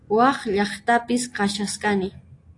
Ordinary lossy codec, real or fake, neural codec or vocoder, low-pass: AAC, 48 kbps; real; none; 10.8 kHz